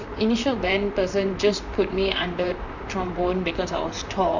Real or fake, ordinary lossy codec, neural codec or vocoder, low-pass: fake; none; vocoder, 44.1 kHz, 128 mel bands, Pupu-Vocoder; 7.2 kHz